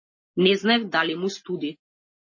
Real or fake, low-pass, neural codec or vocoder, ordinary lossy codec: real; 7.2 kHz; none; MP3, 32 kbps